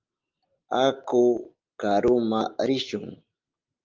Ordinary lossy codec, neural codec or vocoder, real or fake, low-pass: Opus, 32 kbps; none; real; 7.2 kHz